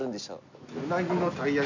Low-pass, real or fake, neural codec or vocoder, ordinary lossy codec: 7.2 kHz; real; none; none